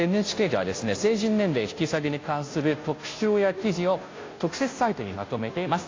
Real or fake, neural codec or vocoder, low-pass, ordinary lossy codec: fake; codec, 16 kHz, 0.5 kbps, FunCodec, trained on Chinese and English, 25 frames a second; 7.2 kHz; AAC, 32 kbps